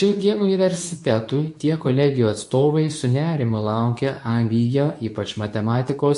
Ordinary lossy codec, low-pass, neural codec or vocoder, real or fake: AAC, 64 kbps; 10.8 kHz; codec, 24 kHz, 0.9 kbps, WavTokenizer, medium speech release version 2; fake